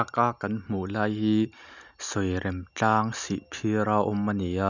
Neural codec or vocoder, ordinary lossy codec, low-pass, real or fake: none; none; 7.2 kHz; real